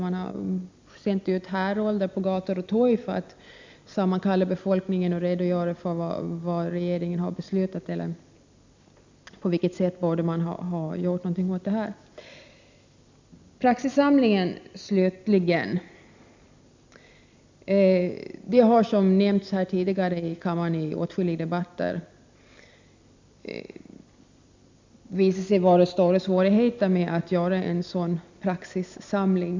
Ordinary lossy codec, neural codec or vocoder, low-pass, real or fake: MP3, 64 kbps; none; 7.2 kHz; real